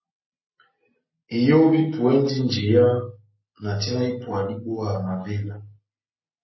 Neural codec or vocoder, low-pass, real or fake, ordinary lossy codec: none; 7.2 kHz; real; MP3, 24 kbps